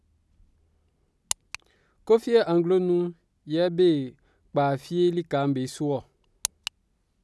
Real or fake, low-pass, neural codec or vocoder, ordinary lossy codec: real; none; none; none